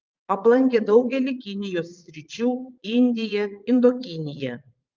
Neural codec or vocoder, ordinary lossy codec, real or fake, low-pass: vocoder, 22.05 kHz, 80 mel bands, Vocos; Opus, 24 kbps; fake; 7.2 kHz